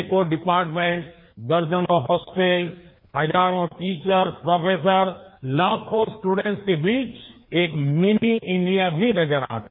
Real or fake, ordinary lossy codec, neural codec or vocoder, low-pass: fake; MP3, 24 kbps; codec, 16 kHz, 2 kbps, FreqCodec, larger model; 5.4 kHz